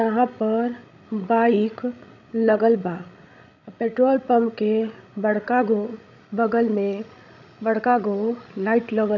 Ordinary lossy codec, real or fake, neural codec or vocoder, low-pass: none; fake; codec, 16 kHz, 16 kbps, FreqCodec, larger model; 7.2 kHz